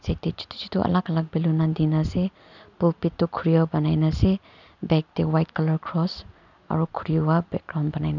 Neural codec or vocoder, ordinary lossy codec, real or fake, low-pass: none; none; real; 7.2 kHz